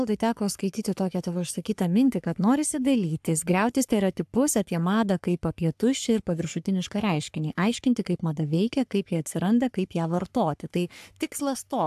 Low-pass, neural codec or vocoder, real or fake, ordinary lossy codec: 14.4 kHz; codec, 44.1 kHz, 3.4 kbps, Pupu-Codec; fake; AAC, 96 kbps